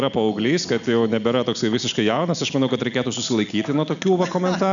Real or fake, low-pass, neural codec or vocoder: real; 7.2 kHz; none